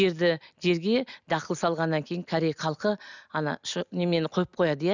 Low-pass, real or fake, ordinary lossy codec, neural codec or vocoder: 7.2 kHz; real; none; none